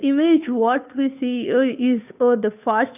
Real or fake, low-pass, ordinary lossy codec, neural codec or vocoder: fake; 3.6 kHz; none; codec, 16 kHz, 2 kbps, FunCodec, trained on Chinese and English, 25 frames a second